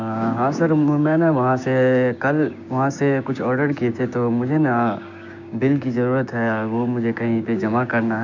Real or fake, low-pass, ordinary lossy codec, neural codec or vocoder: fake; 7.2 kHz; none; codec, 16 kHz, 6 kbps, DAC